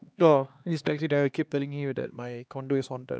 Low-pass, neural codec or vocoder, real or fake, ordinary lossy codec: none; codec, 16 kHz, 2 kbps, X-Codec, HuBERT features, trained on LibriSpeech; fake; none